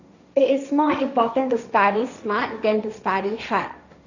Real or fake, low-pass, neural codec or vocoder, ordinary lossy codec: fake; 7.2 kHz; codec, 16 kHz, 1.1 kbps, Voila-Tokenizer; none